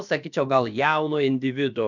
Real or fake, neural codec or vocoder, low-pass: fake; codec, 16 kHz, about 1 kbps, DyCAST, with the encoder's durations; 7.2 kHz